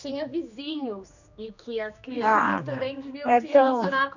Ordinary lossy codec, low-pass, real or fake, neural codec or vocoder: none; 7.2 kHz; fake; codec, 16 kHz, 2 kbps, X-Codec, HuBERT features, trained on general audio